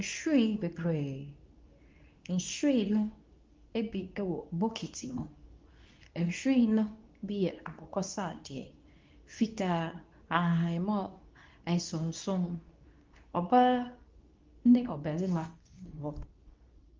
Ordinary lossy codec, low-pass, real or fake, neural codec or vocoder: Opus, 32 kbps; 7.2 kHz; fake; codec, 24 kHz, 0.9 kbps, WavTokenizer, medium speech release version 2